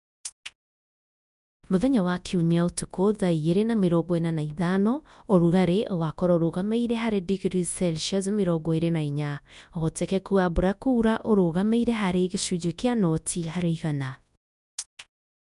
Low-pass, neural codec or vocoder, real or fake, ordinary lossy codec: 10.8 kHz; codec, 24 kHz, 0.9 kbps, WavTokenizer, large speech release; fake; none